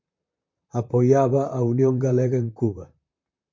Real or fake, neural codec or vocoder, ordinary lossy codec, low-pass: fake; vocoder, 44.1 kHz, 128 mel bands, Pupu-Vocoder; MP3, 48 kbps; 7.2 kHz